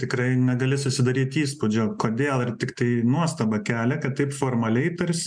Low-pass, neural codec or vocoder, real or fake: 9.9 kHz; none; real